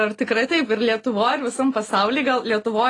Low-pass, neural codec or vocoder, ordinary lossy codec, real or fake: 10.8 kHz; none; AAC, 32 kbps; real